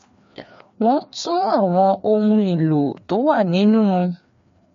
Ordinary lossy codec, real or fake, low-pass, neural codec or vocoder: MP3, 48 kbps; fake; 7.2 kHz; codec, 16 kHz, 2 kbps, FreqCodec, larger model